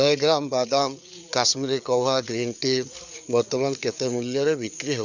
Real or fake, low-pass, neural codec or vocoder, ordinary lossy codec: fake; 7.2 kHz; codec, 16 kHz, 4 kbps, FreqCodec, larger model; none